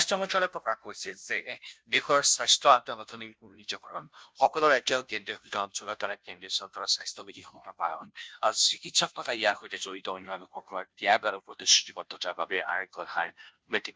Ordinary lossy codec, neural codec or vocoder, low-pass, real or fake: none; codec, 16 kHz, 0.5 kbps, FunCodec, trained on Chinese and English, 25 frames a second; none; fake